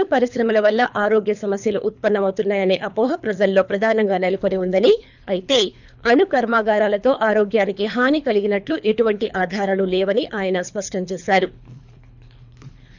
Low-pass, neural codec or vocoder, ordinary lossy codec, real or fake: 7.2 kHz; codec, 24 kHz, 3 kbps, HILCodec; none; fake